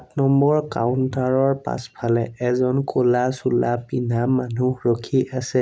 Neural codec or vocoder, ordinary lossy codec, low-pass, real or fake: none; none; none; real